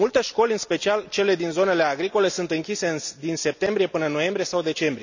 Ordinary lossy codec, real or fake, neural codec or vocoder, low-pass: none; real; none; 7.2 kHz